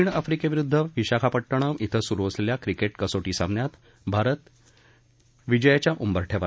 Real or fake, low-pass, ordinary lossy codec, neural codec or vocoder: real; none; none; none